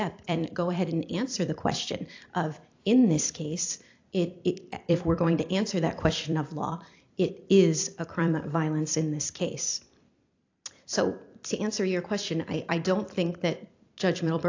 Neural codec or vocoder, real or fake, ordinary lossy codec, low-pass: vocoder, 44.1 kHz, 128 mel bands every 256 samples, BigVGAN v2; fake; AAC, 48 kbps; 7.2 kHz